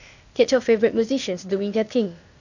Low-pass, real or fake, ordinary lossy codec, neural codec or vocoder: 7.2 kHz; fake; none; codec, 16 kHz, 0.8 kbps, ZipCodec